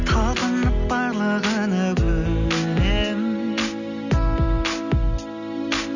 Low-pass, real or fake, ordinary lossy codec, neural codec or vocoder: 7.2 kHz; real; none; none